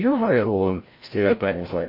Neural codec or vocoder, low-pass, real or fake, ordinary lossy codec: codec, 16 kHz, 0.5 kbps, FreqCodec, larger model; 5.4 kHz; fake; MP3, 24 kbps